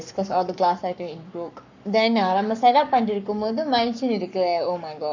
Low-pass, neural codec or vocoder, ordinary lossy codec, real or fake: 7.2 kHz; codec, 44.1 kHz, 7.8 kbps, Pupu-Codec; none; fake